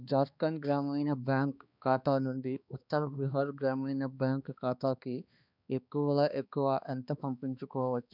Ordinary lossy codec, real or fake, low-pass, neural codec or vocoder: none; fake; 5.4 kHz; codec, 16 kHz, 2 kbps, X-Codec, HuBERT features, trained on balanced general audio